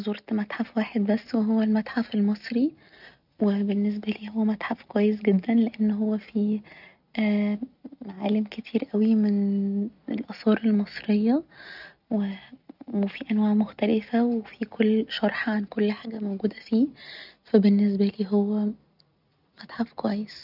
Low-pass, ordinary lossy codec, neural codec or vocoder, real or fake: 5.4 kHz; none; none; real